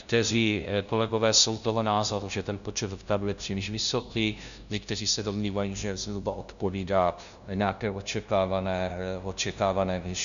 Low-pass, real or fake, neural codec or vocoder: 7.2 kHz; fake; codec, 16 kHz, 0.5 kbps, FunCodec, trained on LibriTTS, 25 frames a second